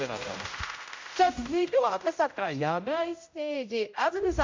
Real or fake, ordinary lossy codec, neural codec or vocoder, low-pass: fake; MP3, 48 kbps; codec, 16 kHz, 0.5 kbps, X-Codec, HuBERT features, trained on general audio; 7.2 kHz